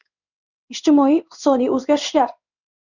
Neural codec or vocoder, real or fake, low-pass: codec, 16 kHz in and 24 kHz out, 1 kbps, XY-Tokenizer; fake; 7.2 kHz